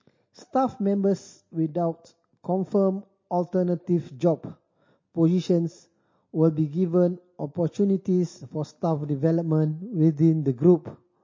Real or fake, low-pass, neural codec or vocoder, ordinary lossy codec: real; 7.2 kHz; none; MP3, 32 kbps